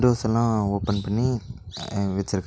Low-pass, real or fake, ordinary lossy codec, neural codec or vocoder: none; real; none; none